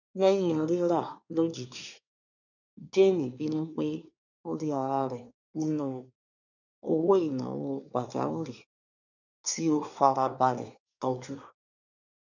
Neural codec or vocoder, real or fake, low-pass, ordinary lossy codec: codec, 24 kHz, 1 kbps, SNAC; fake; 7.2 kHz; none